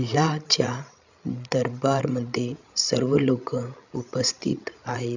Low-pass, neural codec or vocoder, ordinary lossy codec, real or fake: 7.2 kHz; codec, 16 kHz, 16 kbps, FreqCodec, larger model; none; fake